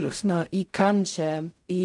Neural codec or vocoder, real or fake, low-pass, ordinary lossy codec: codec, 16 kHz in and 24 kHz out, 0.4 kbps, LongCat-Audio-Codec, fine tuned four codebook decoder; fake; 10.8 kHz; MP3, 96 kbps